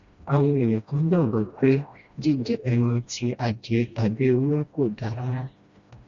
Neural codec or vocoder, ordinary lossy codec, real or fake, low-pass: codec, 16 kHz, 1 kbps, FreqCodec, smaller model; Opus, 64 kbps; fake; 7.2 kHz